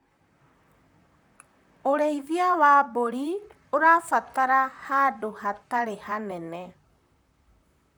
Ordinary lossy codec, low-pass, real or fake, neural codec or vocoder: none; none; fake; vocoder, 44.1 kHz, 128 mel bands, Pupu-Vocoder